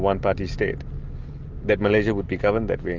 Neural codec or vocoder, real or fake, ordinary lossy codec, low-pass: none; real; Opus, 32 kbps; 7.2 kHz